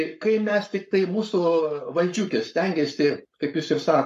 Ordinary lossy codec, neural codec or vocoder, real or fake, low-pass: MP3, 64 kbps; codec, 44.1 kHz, 7.8 kbps, Pupu-Codec; fake; 14.4 kHz